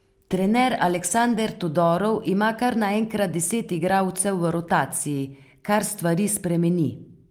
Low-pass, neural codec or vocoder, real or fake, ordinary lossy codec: 19.8 kHz; none; real; Opus, 32 kbps